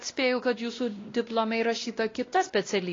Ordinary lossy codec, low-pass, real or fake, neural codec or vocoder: AAC, 32 kbps; 7.2 kHz; fake; codec, 16 kHz, 1 kbps, X-Codec, WavLM features, trained on Multilingual LibriSpeech